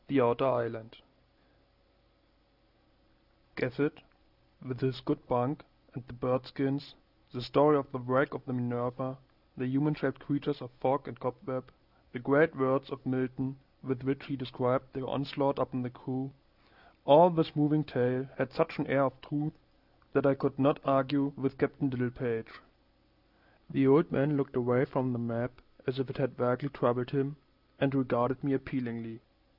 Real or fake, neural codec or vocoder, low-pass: real; none; 5.4 kHz